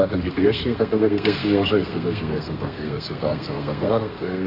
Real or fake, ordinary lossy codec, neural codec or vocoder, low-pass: fake; MP3, 32 kbps; codec, 32 kHz, 1.9 kbps, SNAC; 5.4 kHz